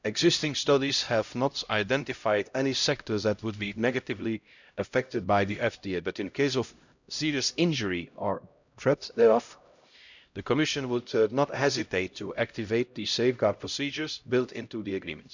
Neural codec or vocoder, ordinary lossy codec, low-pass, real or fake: codec, 16 kHz, 0.5 kbps, X-Codec, HuBERT features, trained on LibriSpeech; none; 7.2 kHz; fake